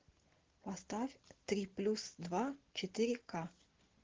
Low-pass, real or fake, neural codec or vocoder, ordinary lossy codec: 7.2 kHz; real; none; Opus, 16 kbps